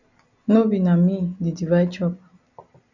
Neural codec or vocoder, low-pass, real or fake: none; 7.2 kHz; real